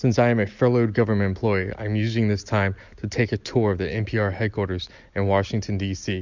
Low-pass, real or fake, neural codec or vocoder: 7.2 kHz; real; none